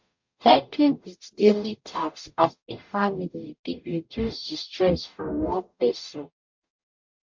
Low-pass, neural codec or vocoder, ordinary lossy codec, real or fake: 7.2 kHz; codec, 44.1 kHz, 0.9 kbps, DAC; MP3, 48 kbps; fake